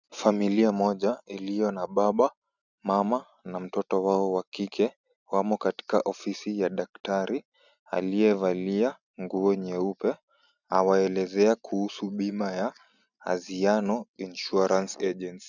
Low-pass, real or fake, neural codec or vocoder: 7.2 kHz; real; none